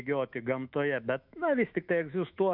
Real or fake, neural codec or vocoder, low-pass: fake; autoencoder, 48 kHz, 128 numbers a frame, DAC-VAE, trained on Japanese speech; 5.4 kHz